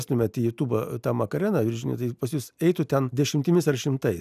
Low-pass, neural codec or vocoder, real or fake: 14.4 kHz; none; real